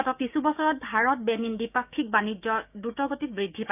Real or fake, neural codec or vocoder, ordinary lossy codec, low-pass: fake; codec, 16 kHz, 6 kbps, DAC; none; 3.6 kHz